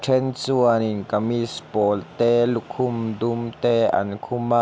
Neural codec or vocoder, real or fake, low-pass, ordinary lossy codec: none; real; none; none